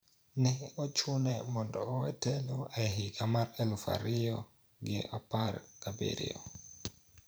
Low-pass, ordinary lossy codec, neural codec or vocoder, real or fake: none; none; vocoder, 44.1 kHz, 128 mel bands every 512 samples, BigVGAN v2; fake